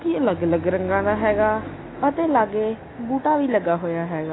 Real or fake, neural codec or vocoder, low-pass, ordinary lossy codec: real; none; 7.2 kHz; AAC, 16 kbps